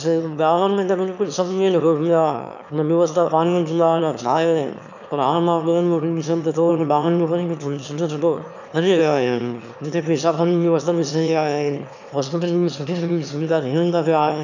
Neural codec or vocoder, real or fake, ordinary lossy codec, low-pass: autoencoder, 22.05 kHz, a latent of 192 numbers a frame, VITS, trained on one speaker; fake; none; 7.2 kHz